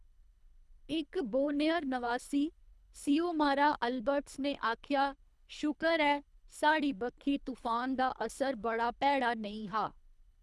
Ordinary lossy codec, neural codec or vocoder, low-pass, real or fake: none; codec, 24 kHz, 3 kbps, HILCodec; none; fake